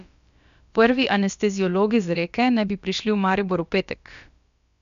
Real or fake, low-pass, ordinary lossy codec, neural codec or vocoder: fake; 7.2 kHz; none; codec, 16 kHz, about 1 kbps, DyCAST, with the encoder's durations